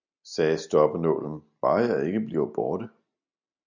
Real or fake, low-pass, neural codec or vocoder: real; 7.2 kHz; none